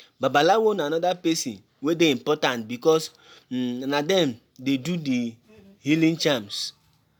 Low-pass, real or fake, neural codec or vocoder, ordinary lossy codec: none; real; none; none